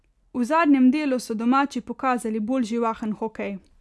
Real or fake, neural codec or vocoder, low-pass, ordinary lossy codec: real; none; none; none